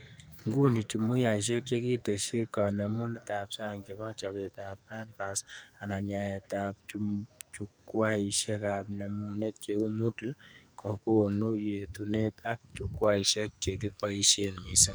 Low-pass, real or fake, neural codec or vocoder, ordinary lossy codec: none; fake; codec, 44.1 kHz, 2.6 kbps, SNAC; none